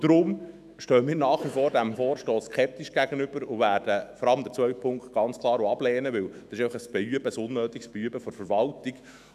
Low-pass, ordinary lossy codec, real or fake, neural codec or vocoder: 14.4 kHz; none; fake; autoencoder, 48 kHz, 128 numbers a frame, DAC-VAE, trained on Japanese speech